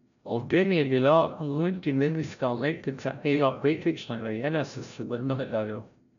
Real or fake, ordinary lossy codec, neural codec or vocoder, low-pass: fake; none; codec, 16 kHz, 0.5 kbps, FreqCodec, larger model; 7.2 kHz